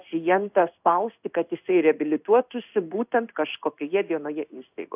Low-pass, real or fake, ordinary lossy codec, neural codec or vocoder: 3.6 kHz; fake; AAC, 32 kbps; codec, 16 kHz in and 24 kHz out, 1 kbps, XY-Tokenizer